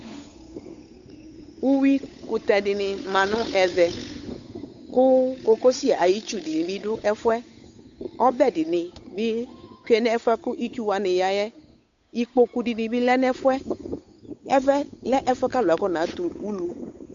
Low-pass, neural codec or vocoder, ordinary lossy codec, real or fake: 7.2 kHz; codec, 16 kHz, 8 kbps, FunCodec, trained on Chinese and English, 25 frames a second; AAC, 64 kbps; fake